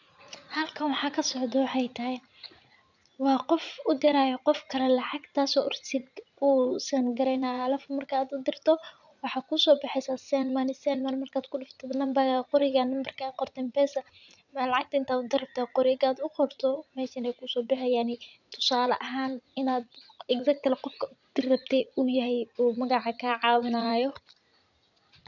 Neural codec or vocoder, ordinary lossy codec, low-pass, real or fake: vocoder, 44.1 kHz, 80 mel bands, Vocos; none; 7.2 kHz; fake